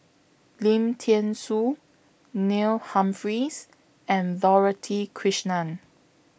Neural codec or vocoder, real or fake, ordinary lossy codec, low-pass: none; real; none; none